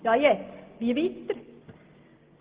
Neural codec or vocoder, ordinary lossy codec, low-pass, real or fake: none; Opus, 16 kbps; 3.6 kHz; real